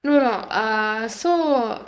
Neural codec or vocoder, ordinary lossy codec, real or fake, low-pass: codec, 16 kHz, 4.8 kbps, FACodec; none; fake; none